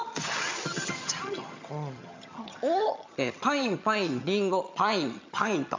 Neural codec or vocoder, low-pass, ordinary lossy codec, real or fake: vocoder, 22.05 kHz, 80 mel bands, HiFi-GAN; 7.2 kHz; MP3, 64 kbps; fake